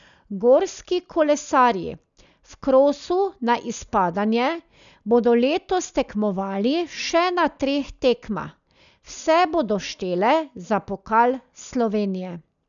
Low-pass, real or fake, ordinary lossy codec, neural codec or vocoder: 7.2 kHz; real; none; none